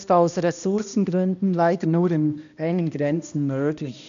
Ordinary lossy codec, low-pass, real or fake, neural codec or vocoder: none; 7.2 kHz; fake; codec, 16 kHz, 1 kbps, X-Codec, HuBERT features, trained on balanced general audio